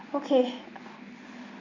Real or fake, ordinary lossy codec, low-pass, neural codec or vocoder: real; MP3, 64 kbps; 7.2 kHz; none